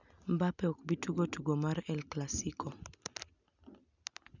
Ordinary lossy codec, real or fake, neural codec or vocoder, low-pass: none; real; none; 7.2 kHz